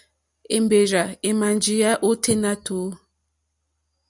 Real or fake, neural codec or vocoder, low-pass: real; none; 10.8 kHz